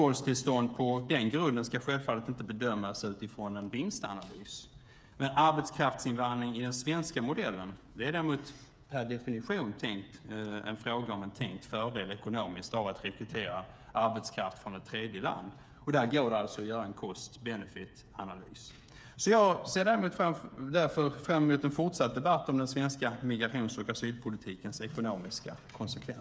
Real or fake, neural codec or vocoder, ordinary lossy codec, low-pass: fake; codec, 16 kHz, 8 kbps, FreqCodec, smaller model; none; none